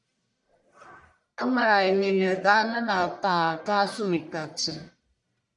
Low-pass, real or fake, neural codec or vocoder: 10.8 kHz; fake; codec, 44.1 kHz, 1.7 kbps, Pupu-Codec